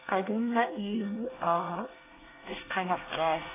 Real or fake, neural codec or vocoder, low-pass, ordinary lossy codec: fake; codec, 24 kHz, 1 kbps, SNAC; 3.6 kHz; AAC, 16 kbps